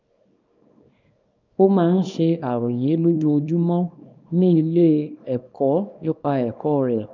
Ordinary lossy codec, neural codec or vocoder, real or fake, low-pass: none; codec, 24 kHz, 0.9 kbps, WavTokenizer, small release; fake; 7.2 kHz